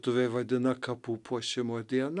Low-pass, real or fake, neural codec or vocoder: 10.8 kHz; real; none